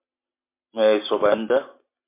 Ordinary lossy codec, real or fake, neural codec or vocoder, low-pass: MP3, 24 kbps; real; none; 3.6 kHz